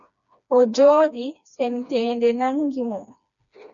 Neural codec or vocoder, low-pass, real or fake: codec, 16 kHz, 2 kbps, FreqCodec, smaller model; 7.2 kHz; fake